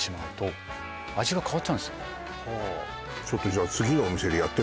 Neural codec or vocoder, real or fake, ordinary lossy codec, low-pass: none; real; none; none